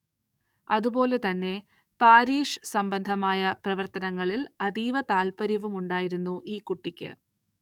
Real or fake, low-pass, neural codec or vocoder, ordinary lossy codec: fake; 19.8 kHz; codec, 44.1 kHz, 7.8 kbps, DAC; none